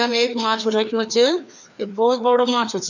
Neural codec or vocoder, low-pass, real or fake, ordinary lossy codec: codec, 16 kHz, 2 kbps, FreqCodec, larger model; 7.2 kHz; fake; none